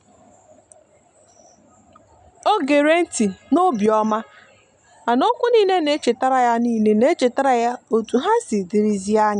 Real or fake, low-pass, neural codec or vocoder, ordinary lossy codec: real; 10.8 kHz; none; none